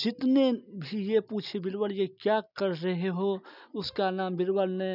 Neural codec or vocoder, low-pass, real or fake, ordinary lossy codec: none; 5.4 kHz; real; none